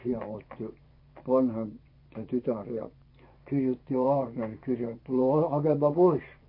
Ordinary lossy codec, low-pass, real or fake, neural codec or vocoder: MP3, 32 kbps; 5.4 kHz; fake; vocoder, 24 kHz, 100 mel bands, Vocos